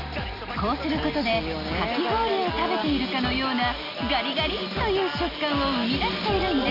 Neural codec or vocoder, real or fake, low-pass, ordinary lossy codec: none; real; 5.4 kHz; none